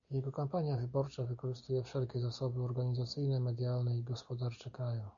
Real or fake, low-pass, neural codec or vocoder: real; 7.2 kHz; none